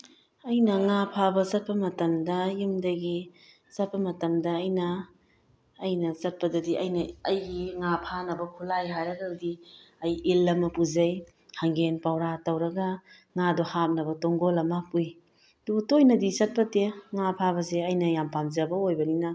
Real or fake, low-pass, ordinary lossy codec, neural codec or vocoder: real; none; none; none